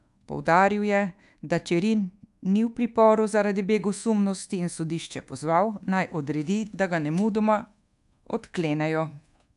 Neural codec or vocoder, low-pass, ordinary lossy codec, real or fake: codec, 24 kHz, 1.2 kbps, DualCodec; 10.8 kHz; AAC, 96 kbps; fake